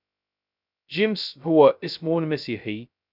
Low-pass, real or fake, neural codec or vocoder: 5.4 kHz; fake; codec, 16 kHz, 0.2 kbps, FocalCodec